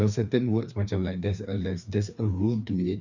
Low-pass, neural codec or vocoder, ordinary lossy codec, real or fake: 7.2 kHz; codec, 16 kHz, 2 kbps, FreqCodec, larger model; none; fake